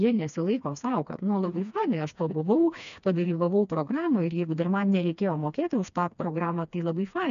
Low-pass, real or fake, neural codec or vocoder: 7.2 kHz; fake; codec, 16 kHz, 2 kbps, FreqCodec, smaller model